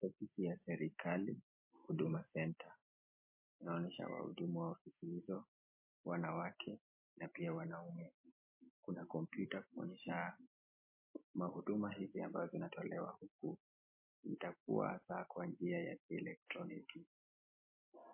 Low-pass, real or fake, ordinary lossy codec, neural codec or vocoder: 3.6 kHz; real; MP3, 24 kbps; none